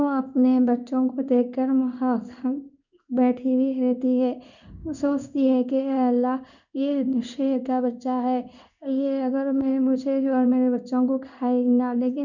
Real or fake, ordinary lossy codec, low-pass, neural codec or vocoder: fake; none; 7.2 kHz; codec, 16 kHz in and 24 kHz out, 1 kbps, XY-Tokenizer